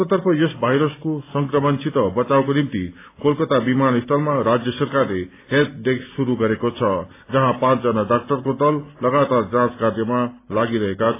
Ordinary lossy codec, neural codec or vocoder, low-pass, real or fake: AAC, 24 kbps; none; 3.6 kHz; real